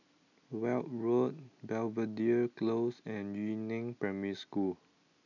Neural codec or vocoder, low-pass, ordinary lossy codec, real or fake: none; 7.2 kHz; none; real